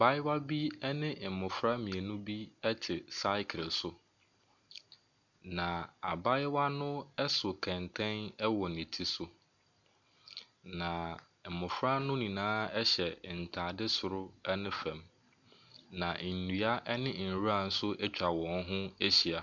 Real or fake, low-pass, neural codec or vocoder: real; 7.2 kHz; none